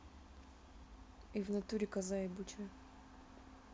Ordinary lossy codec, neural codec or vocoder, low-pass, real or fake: none; none; none; real